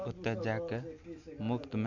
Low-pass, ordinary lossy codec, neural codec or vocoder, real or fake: 7.2 kHz; none; none; real